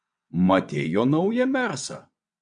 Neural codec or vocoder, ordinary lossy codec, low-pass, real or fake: vocoder, 22.05 kHz, 80 mel bands, WaveNeXt; MP3, 64 kbps; 9.9 kHz; fake